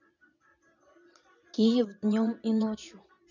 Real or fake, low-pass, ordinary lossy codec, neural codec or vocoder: fake; 7.2 kHz; none; vocoder, 22.05 kHz, 80 mel bands, Vocos